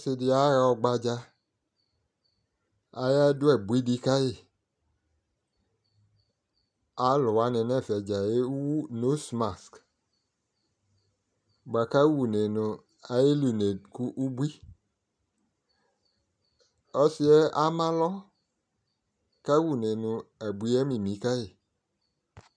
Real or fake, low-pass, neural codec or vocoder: real; 9.9 kHz; none